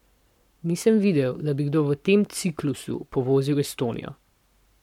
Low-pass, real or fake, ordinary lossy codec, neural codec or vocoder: 19.8 kHz; fake; MP3, 96 kbps; codec, 44.1 kHz, 7.8 kbps, Pupu-Codec